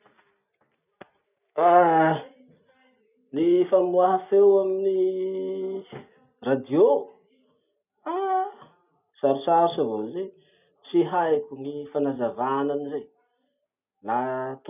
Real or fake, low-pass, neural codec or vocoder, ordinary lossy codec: real; 3.6 kHz; none; none